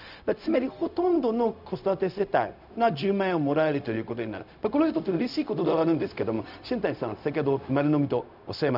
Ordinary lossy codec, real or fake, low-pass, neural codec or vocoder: none; fake; 5.4 kHz; codec, 16 kHz, 0.4 kbps, LongCat-Audio-Codec